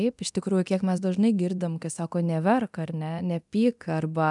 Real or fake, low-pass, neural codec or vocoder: fake; 10.8 kHz; codec, 24 kHz, 0.9 kbps, DualCodec